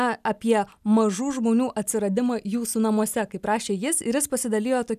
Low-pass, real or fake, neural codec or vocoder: 14.4 kHz; real; none